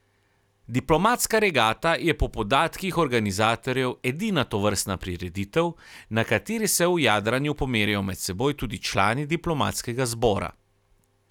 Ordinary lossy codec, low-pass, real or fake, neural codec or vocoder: none; 19.8 kHz; real; none